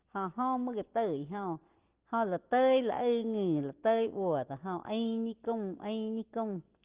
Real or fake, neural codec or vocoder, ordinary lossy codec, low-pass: real; none; Opus, 16 kbps; 3.6 kHz